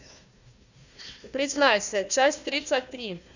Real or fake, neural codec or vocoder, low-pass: fake; codec, 16 kHz, 1 kbps, FunCodec, trained on Chinese and English, 50 frames a second; 7.2 kHz